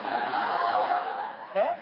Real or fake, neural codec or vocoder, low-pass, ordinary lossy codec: fake; codec, 16 kHz, 2 kbps, FreqCodec, smaller model; 5.4 kHz; MP3, 24 kbps